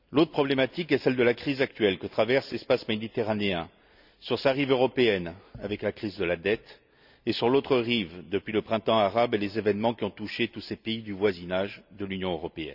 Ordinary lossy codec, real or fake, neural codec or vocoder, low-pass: none; real; none; 5.4 kHz